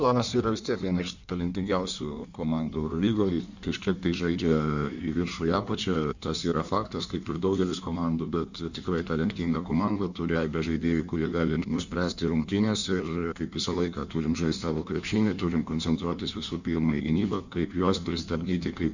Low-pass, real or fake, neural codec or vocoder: 7.2 kHz; fake; codec, 16 kHz in and 24 kHz out, 1.1 kbps, FireRedTTS-2 codec